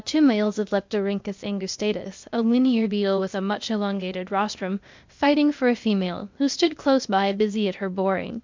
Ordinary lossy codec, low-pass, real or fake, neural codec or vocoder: MP3, 64 kbps; 7.2 kHz; fake; codec, 16 kHz, 0.8 kbps, ZipCodec